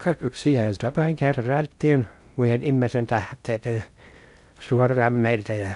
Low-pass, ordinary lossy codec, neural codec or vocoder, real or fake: 10.8 kHz; none; codec, 16 kHz in and 24 kHz out, 0.6 kbps, FocalCodec, streaming, 4096 codes; fake